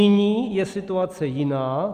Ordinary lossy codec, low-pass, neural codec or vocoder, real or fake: Opus, 32 kbps; 14.4 kHz; none; real